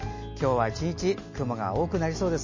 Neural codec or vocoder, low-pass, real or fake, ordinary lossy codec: none; 7.2 kHz; real; MP3, 32 kbps